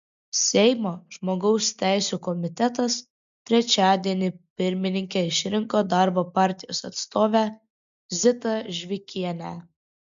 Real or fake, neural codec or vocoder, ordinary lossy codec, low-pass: real; none; MP3, 64 kbps; 7.2 kHz